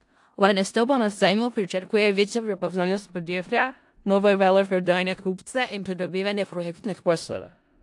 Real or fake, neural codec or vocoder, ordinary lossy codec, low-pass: fake; codec, 16 kHz in and 24 kHz out, 0.4 kbps, LongCat-Audio-Codec, four codebook decoder; AAC, 64 kbps; 10.8 kHz